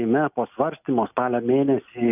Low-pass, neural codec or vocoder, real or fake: 3.6 kHz; none; real